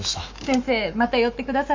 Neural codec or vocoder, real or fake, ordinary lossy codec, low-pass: autoencoder, 48 kHz, 128 numbers a frame, DAC-VAE, trained on Japanese speech; fake; MP3, 48 kbps; 7.2 kHz